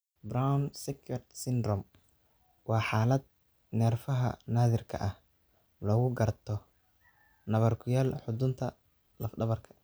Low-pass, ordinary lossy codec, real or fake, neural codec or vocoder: none; none; real; none